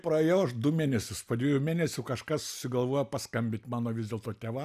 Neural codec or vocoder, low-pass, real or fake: none; 14.4 kHz; real